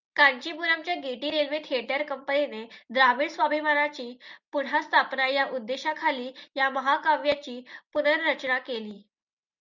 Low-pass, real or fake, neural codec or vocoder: 7.2 kHz; real; none